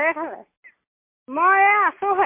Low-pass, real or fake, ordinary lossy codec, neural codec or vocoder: 3.6 kHz; real; MP3, 24 kbps; none